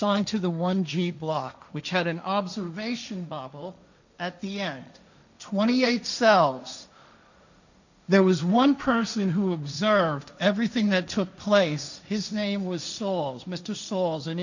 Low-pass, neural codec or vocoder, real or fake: 7.2 kHz; codec, 16 kHz, 1.1 kbps, Voila-Tokenizer; fake